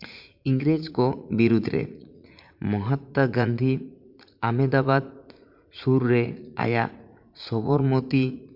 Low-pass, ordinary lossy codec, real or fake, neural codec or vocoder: 5.4 kHz; MP3, 48 kbps; fake; vocoder, 44.1 kHz, 80 mel bands, Vocos